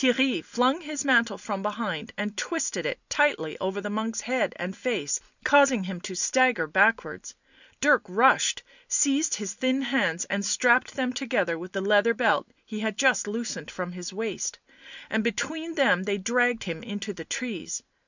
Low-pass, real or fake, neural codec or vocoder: 7.2 kHz; real; none